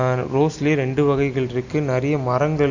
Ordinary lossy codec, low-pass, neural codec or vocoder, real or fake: AAC, 48 kbps; 7.2 kHz; none; real